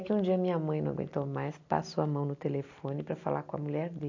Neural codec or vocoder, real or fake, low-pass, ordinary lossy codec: none; real; 7.2 kHz; AAC, 48 kbps